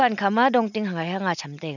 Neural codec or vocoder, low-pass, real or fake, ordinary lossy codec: none; 7.2 kHz; real; none